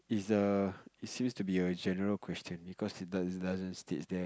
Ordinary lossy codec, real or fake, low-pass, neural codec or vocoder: none; real; none; none